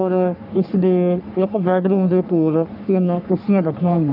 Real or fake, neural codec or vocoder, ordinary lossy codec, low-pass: fake; codec, 44.1 kHz, 3.4 kbps, Pupu-Codec; none; 5.4 kHz